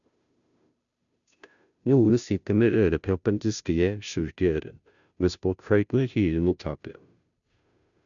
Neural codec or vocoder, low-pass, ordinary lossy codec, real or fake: codec, 16 kHz, 0.5 kbps, FunCodec, trained on Chinese and English, 25 frames a second; 7.2 kHz; none; fake